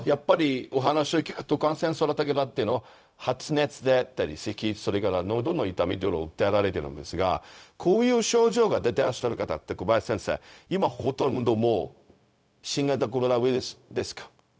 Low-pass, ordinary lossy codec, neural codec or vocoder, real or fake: none; none; codec, 16 kHz, 0.4 kbps, LongCat-Audio-Codec; fake